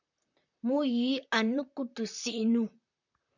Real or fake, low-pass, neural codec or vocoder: fake; 7.2 kHz; vocoder, 44.1 kHz, 128 mel bands, Pupu-Vocoder